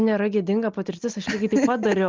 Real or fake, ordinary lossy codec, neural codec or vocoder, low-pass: real; Opus, 32 kbps; none; 7.2 kHz